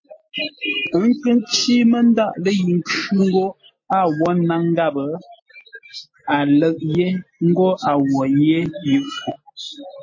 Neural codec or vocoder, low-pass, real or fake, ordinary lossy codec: none; 7.2 kHz; real; MP3, 32 kbps